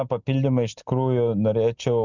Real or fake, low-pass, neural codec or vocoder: real; 7.2 kHz; none